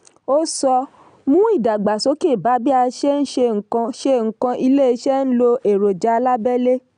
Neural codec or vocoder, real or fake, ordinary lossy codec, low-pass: none; real; none; 9.9 kHz